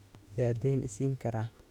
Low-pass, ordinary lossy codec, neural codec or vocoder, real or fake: 19.8 kHz; none; autoencoder, 48 kHz, 32 numbers a frame, DAC-VAE, trained on Japanese speech; fake